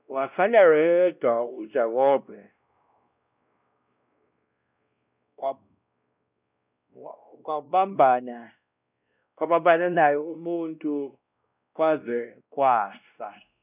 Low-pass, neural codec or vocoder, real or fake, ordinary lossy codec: 3.6 kHz; codec, 16 kHz, 1 kbps, X-Codec, WavLM features, trained on Multilingual LibriSpeech; fake; none